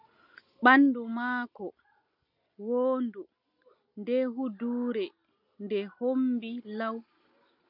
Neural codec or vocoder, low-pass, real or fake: none; 5.4 kHz; real